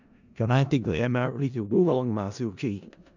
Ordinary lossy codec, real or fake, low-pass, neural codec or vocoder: none; fake; 7.2 kHz; codec, 16 kHz in and 24 kHz out, 0.4 kbps, LongCat-Audio-Codec, four codebook decoder